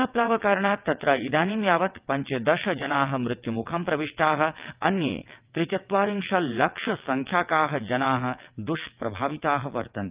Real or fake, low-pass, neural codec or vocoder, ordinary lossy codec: fake; 3.6 kHz; vocoder, 22.05 kHz, 80 mel bands, WaveNeXt; Opus, 24 kbps